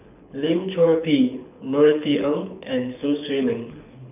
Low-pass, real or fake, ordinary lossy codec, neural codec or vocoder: 3.6 kHz; fake; none; codec, 24 kHz, 6 kbps, HILCodec